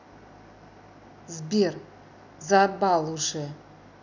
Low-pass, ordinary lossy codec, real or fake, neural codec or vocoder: 7.2 kHz; none; real; none